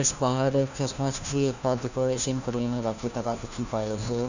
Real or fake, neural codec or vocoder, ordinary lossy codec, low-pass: fake; codec, 16 kHz, 1 kbps, FunCodec, trained on LibriTTS, 50 frames a second; none; 7.2 kHz